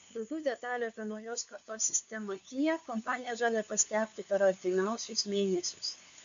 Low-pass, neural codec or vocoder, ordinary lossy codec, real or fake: 7.2 kHz; codec, 16 kHz, 2 kbps, FunCodec, trained on LibriTTS, 25 frames a second; AAC, 64 kbps; fake